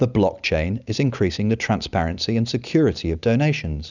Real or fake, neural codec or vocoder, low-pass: real; none; 7.2 kHz